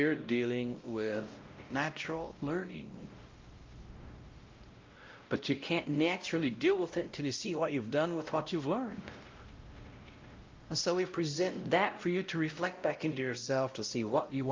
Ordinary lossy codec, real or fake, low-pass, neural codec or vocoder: Opus, 24 kbps; fake; 7.2 kHz; codec, 16 kHz, 0.5 kbps, X-Codec, WavLM features, trained on Multilingual LibriSpeech